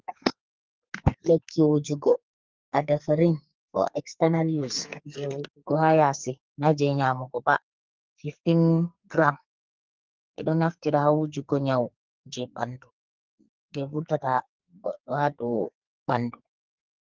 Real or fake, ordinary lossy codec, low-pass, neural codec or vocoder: fake; Opus, 32 kbps; 7.2 kHz; codec, 44.1 kHz, 2.6 kbps, SNAC